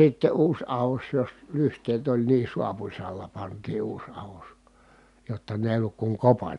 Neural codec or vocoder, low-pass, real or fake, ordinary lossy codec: none; 10.8 kHz; real; none